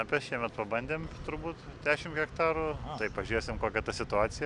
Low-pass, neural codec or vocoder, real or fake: 10.8 kHz; none; real